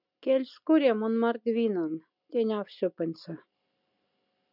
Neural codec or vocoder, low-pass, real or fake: none; 5.4 kHz; real